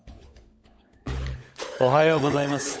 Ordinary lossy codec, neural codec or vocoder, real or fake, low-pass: none; codec, 16 kHz, 16 kbps, FunCodec, trained on LibriTTS, 50 frames a second; fake; none